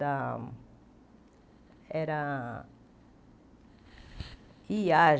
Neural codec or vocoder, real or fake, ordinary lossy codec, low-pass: none; real; none; none